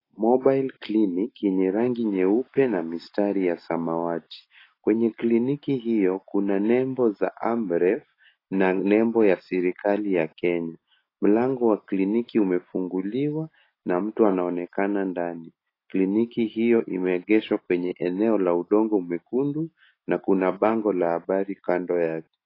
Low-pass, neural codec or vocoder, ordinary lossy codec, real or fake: 5.4 kHz; none; AAC, 24 kbps; real